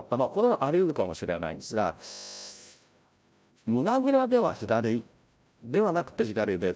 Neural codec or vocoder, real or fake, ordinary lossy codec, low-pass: codec, 16 kHz, 0.5 kbps, FreqCodec, larger model; fake; none; none